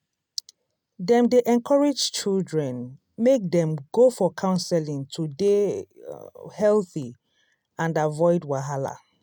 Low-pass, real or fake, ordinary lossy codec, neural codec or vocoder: 19.8 kHz; real; none; none